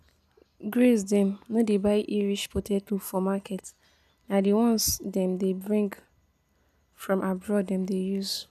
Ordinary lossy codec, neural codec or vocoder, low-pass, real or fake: none; none; 14.4 kHz; real